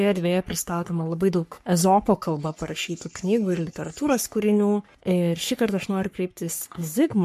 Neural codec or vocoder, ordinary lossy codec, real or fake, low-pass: codec, 44.1 kHz, 3.4 kbps, Pupu-Codec; MP3, 64 kbps; fake; 14.4 kHz